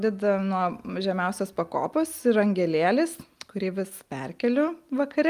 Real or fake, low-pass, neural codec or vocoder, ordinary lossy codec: real; 14.4 kHz; none; Opus, 32 kbps